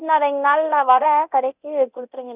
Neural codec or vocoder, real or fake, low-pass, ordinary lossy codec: codec, 24 kHz, 0.9 kbps, DualCodec; fake; 3.6 kHz; none